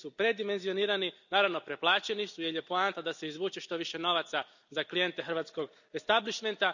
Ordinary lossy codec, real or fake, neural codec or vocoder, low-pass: none; real; none; 7.2 kHz